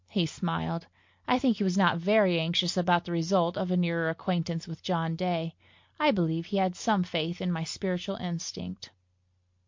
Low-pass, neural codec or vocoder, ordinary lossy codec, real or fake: 7.2 kHz; none; MP3, 48 kbps; real